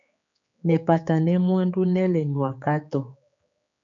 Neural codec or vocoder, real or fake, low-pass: codec, 16 kHz, 4 kbps, X-Codec, HuBERT features, trained on general audio; fake; 7.2 kHz